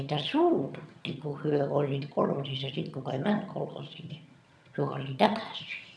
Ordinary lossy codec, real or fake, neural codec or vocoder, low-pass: none; fake; vocoder, 22.05 kHz, 80 mel bands, HiFi-GAN; none